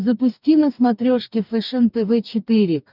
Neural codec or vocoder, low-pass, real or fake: codec, 44.1 kHz, 2.6 kbps, DAC; 5.4 kHz; fake